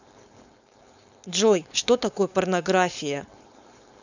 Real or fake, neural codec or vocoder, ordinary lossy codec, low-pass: fake; codec, 16 kHz, 4.8 kbps, FACodec; none; 7.2 kHz